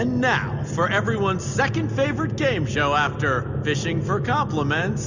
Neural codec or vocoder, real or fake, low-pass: none; real; 7.2 kHz